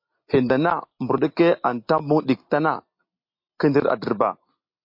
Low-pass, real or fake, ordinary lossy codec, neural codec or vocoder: 5.4 kHz; real; MP3, 32 kbps; none